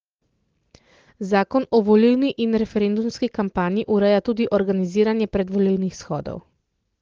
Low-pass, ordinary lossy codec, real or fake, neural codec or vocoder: 7.2 kHz; Opus, 16 kbps; real; none